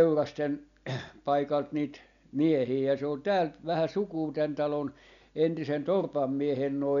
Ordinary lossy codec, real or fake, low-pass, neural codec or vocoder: none; real; 7.2 kHz; none